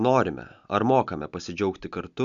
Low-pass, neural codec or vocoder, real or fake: 7.2 kHz; none; real